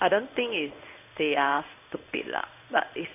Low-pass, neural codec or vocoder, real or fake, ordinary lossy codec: 3.6 kHz; codec, 16 kHz in and 24 kHz out, 1 kbps, XY-Tokenizer; fake; none